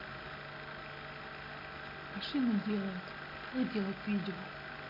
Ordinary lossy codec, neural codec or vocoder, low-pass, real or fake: AAC, 32 kbps; none; 5.4 kHz; real